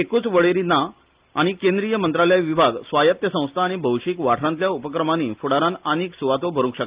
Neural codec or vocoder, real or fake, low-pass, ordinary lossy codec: none; real; 3.6 kHz; Opus, 32 kbps